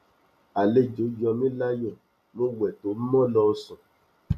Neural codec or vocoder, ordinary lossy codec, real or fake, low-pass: none; none; real; 14.4 kHz